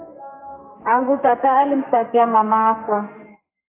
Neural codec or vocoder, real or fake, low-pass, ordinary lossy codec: codec, 32 kHz, 1.9 kbps, SNAC; fake; 3.6 kHz; AAC, 24 kbps